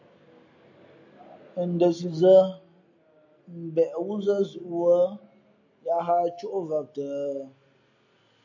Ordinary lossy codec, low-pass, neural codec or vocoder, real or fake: AAC, 48 kbps; 7.2 kHz; none; real